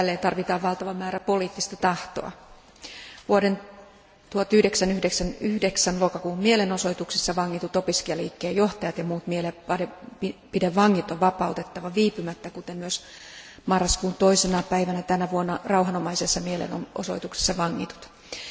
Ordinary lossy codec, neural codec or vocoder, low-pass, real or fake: none; none; none; real